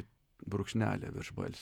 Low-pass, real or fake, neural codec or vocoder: 19.8 kHz; fake; vocoder, 48 kHz, 128 mel bands, Vocos